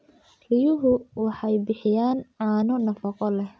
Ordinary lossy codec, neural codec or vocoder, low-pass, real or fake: none; none; none; real